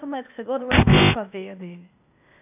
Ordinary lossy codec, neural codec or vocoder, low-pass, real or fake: AAC, 32 kbps; codec, 16 kHz, 0.8 kbps, ZipCodec; 3.6 kHz; fake